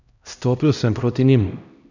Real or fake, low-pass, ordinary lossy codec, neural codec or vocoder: fake; 7.2 kHz; none; codec, 16 kHz, 1 kbps, X-Codec, HuBERT features, trained on LibriSpeech